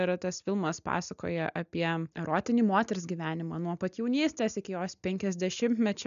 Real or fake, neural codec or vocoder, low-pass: real; none; 7.2 kHz